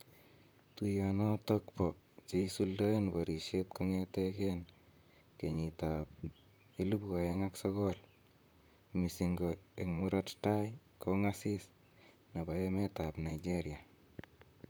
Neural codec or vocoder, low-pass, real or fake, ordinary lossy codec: vocoder, 44.1 kHz, 128 mel bands, Pupu-Vocoder; none; fake; none